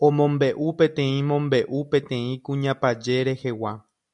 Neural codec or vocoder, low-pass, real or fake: none; 10.8 kHz; real